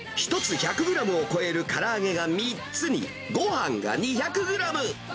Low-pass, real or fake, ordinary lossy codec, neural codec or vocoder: none; real; none; none